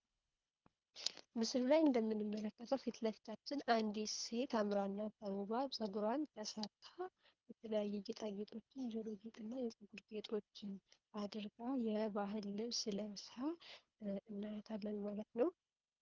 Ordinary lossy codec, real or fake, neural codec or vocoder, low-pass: Opus, 32 kbps; fake; codec, 24 kHz, 3 kbps, HILCodec; 7.2 kHz